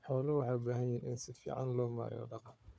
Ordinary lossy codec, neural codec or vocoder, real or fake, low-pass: none; codec, 16 kHz, 4 kbps, FunCodec, trained on Chinese and English, 50 frames a second; fake; none